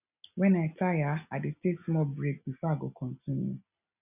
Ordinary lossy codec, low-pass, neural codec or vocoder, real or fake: none; 3.6 kHz; none; real